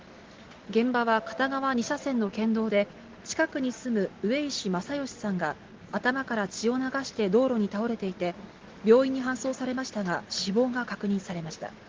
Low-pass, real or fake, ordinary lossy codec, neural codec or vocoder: 7.2 kHz; real; Opus, 16 kbps; none